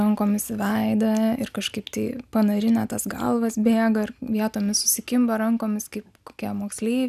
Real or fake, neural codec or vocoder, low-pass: real; none; 14.4 kHz